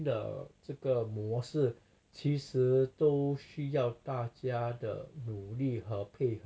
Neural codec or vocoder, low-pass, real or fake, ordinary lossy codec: none; none; real; none